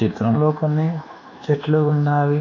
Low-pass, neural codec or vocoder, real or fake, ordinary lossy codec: 7.2 kHz; codec, 24 kHz, 1.2 kbps, DualCodec; fake; none